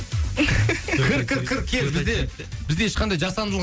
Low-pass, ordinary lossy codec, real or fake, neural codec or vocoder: none; none; real; none